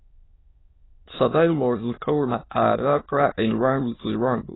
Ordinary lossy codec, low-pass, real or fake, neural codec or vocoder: AAC, 16 kbps; 7.2 kHz; fake; autoencoder, 22.05 kHz, a latent of 192 numbers a frame, VITS, trained on many speakers